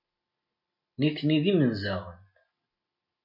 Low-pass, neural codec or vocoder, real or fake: 5.4 kHz; none; real